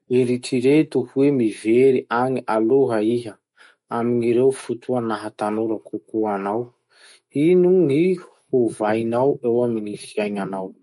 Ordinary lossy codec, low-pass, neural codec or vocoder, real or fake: MP3, 48 kbps; 19.8 kHz; autoencoder, 48 kHz, 128 numbers a frame, DAC-VAE, trained on Japanese speech; fake